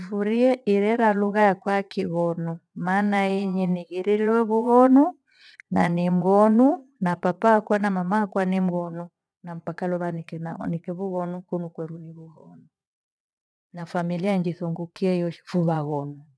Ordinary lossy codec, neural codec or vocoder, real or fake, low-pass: none; vocoder, 22.05 kHz, 80 mel bands, Vocos; fake; none